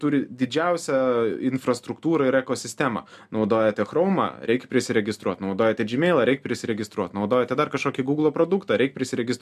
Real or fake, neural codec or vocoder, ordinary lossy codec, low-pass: real; none; MP3, 96 kbps; 14.4 kHz